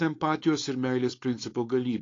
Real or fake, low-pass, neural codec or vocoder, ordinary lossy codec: fake; 7.2 kHz; codec, 16 kHz, 4.8 kbps, FACodec; AAC, 32 kbps